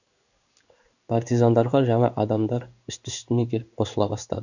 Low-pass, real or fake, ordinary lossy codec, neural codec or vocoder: 7.2 kHz; fake; none; codec, 16 kHz in and 24 kHz out, 1 kbps, XY-Tokenizer